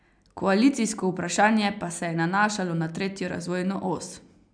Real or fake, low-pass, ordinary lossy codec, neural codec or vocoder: real; 9.9 kHz; none; none